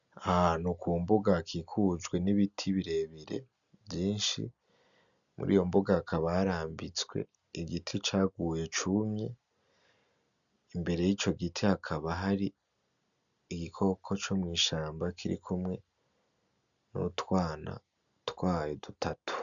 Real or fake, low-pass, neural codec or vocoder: real; 7.2 kHz; none